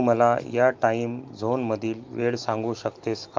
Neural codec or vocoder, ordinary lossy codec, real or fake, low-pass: none; Opus, 24 kbps; real; 7.2 kHz